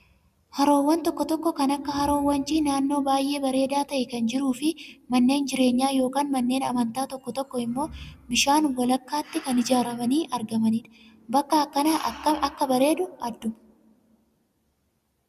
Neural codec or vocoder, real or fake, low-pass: none; real; 14.4 kHz